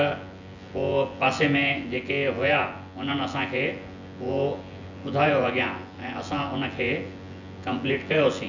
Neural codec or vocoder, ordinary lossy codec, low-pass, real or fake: vocoder, 24 kHz, 100 mel bands, Vocos; none; 7.2 kHz; fake